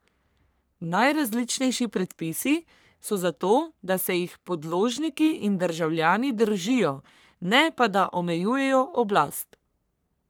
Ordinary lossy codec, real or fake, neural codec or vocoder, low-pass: none; fake; codec, 44.1 kHz, 3.4 kbps, Pupu-Codec; none